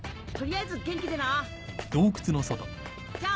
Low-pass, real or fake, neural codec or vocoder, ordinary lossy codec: none; real; none; none